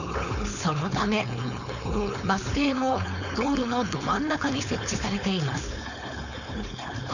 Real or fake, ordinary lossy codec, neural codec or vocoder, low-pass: fake; none; codec, 16 kHz, 4.8 kbps, FACodec; 7.2 kHz